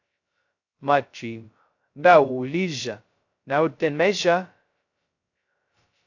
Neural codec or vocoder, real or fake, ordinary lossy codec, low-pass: codec, 16 kHz, 0.2 kbps, FocalCodec; fake; AAC, 48 kbps; 7.2 kHz